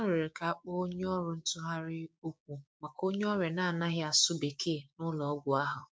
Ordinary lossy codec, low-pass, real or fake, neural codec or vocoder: none; none; fake; codec, 16 kHz, 6 kbps, DAC